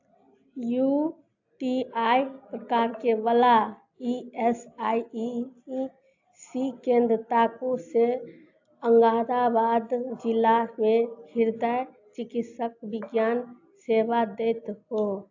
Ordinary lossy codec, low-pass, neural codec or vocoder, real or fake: AAC, 48 kbps; 7.2 kHz; none; real